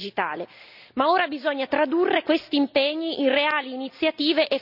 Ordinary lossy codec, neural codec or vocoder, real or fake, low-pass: none; none; real; 5.4 kHz